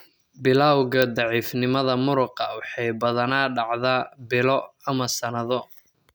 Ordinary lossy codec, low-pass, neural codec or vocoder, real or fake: none; none; none; real